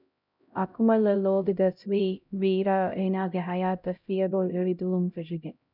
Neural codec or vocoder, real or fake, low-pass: codec, 16 kHz, 0.5 kbps, X-Codec, HuBERT features, trained on LibriSpeech; fake; 5.4 kHz